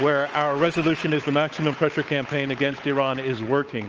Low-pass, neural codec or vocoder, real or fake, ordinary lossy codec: 7.2 kHz; codec, 16 kHz, 8 kbps, FunCodec, trained on Chinese and English, 25 frames a second; fake; Opus, 24 kbps